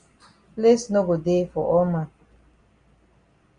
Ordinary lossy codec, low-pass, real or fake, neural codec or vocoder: Opus, 64 kbps; 9.9 kHz; real; none